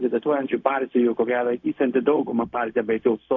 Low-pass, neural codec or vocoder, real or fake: 7.2 kHz; codec, 16 kHz, 0.4 kbps, LongCat-Audio-Codec; fake